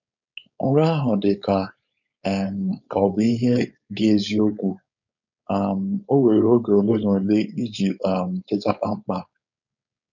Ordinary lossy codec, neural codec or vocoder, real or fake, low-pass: none; codec, 16 kHz, 4.8 kbps, FACodec; fake; 7.2 kHz